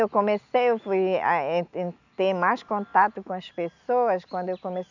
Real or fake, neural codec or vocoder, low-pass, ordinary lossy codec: real; none; 7.2 kHz; none